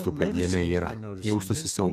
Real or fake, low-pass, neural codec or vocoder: fake; 14.4 kHz; codec, 32 kHz, 1.9 kbps, SNAC